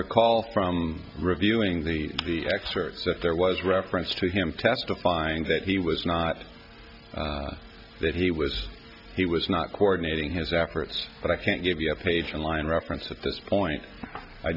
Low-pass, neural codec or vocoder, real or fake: 5.4 kHz; none; real